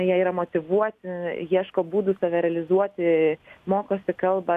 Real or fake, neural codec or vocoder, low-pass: real; none; 14.4 kHz